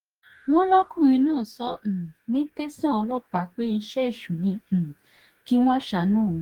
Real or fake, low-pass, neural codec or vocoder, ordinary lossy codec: fake; 19.8 kHz; codec, 44.1 kHz, 2.6 kbps, DAC; Opus, 24 kbps